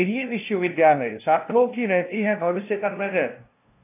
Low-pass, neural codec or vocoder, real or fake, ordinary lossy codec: 3.6 kHz; codec, 16 kHz, 0.5 kbps, FunCodec, trained on LibriTTS, 25 frames a second; fake; AAC, 24 kbps